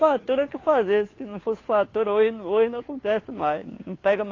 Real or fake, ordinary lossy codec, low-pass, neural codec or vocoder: fake; AAC, 32 kbps; 7.2 kHz; codec, 16 kHz, 2 kbps, FunCodec, trained on Chinese and English, 25 frames a second